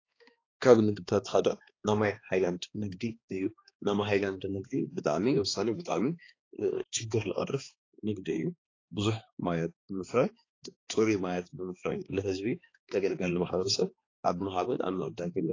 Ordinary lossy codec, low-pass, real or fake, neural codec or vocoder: AAC, 32 kbps; 7.2 kHz; fake; codec, 16 kHz, 2 kbps, X-Codec, HuBERT features, trained on balanced general audio